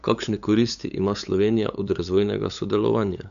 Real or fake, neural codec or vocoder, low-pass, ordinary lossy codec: fake; codec, 16 kHz, 8 kbps, FunCodec, trained on LibriTTS, 25 frames a second; 7.2 kHz; none